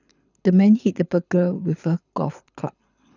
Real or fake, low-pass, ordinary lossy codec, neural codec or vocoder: fake; 7.2 kHz; none; codec, 24 kHz, 6 kbps, HILCodec